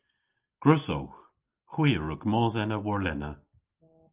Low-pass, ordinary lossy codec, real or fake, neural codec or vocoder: 3.6 kHz; Opus, 32 kbps; real; none